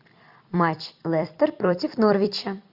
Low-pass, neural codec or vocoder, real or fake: 5.4 kHz; vocoder, 44.1 kHz, 128 mel bands every 256 samples, BigVGAN v2; fake